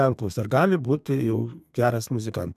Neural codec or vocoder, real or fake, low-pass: codec, 32 kHz, 1.9 kbps, SNAC; fake; 14.4 kHz